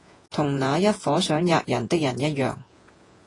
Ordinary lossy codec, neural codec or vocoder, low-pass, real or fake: AAC, 32 kbps; vocoder, 48 kHz, 128 mel bands, Vocos; 10.8 kHz; fake